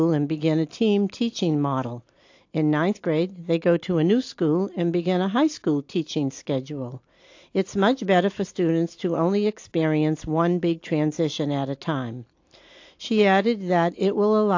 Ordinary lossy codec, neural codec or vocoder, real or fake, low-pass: AAC, 48 kbps; none; real; 7.2 kHz